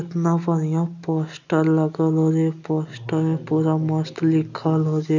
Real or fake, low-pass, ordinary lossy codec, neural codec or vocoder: real; 7.2 kHz; none; none